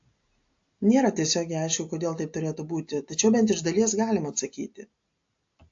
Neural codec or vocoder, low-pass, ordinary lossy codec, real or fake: none; 7.2 kHz; AAC, 48 kbps; real